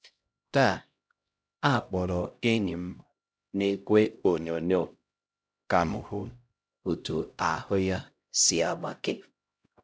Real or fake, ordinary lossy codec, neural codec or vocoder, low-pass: fake; none; codec, 16 kHz, 0.5 kbps, X-Codec, HuBERT features, trained on LibriSpeech; none